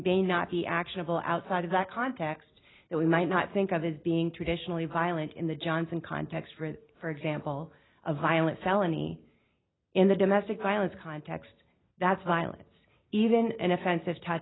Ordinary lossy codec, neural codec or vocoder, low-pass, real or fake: AAC, 16 kbps; none; 7.2 kHz; real